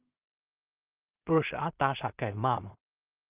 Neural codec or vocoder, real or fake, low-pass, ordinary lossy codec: codec, 16 kHz in and 24 kHz out, 0.4 kbps, LongCat-Audio-Codec, two codebook decoder; fake; 3.6 kHz; Opus, 24 kbps